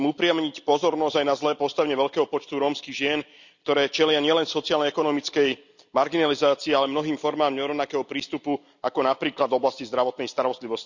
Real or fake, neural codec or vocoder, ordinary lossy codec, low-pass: real; none; none; 7.2 kHz